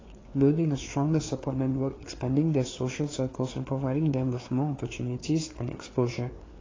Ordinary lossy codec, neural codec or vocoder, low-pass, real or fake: AAC, 32 kbps; codec, 16 kHz, 4 kbps, FreqCodec, larger model; 7.2 kHz; fake